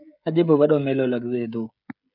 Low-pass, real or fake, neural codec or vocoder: 5.4 kHz; fake; codec, 16 kHz, 16 kbps, FreqCodec, smaller model